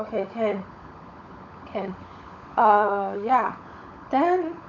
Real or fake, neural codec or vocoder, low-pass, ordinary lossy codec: fake; codec, 16 kHz, 16 kbps, FunCodec, trained on LibriTTS, 50 frames a second; 7.2 kHz; none